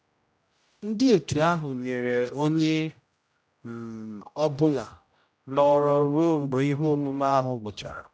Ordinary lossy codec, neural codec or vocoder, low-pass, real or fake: none; codec, 16 kHz, 0.5 kbps, X-Codec, HuBERT features, trained on general audio; none; fake